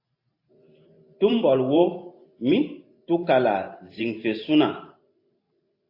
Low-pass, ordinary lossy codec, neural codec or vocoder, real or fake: 5.4 kHz; AAC, 32 kbps; vocoder, 44.1 kHz, 128 mel bands every 512 samples, BigVGAN v2; fake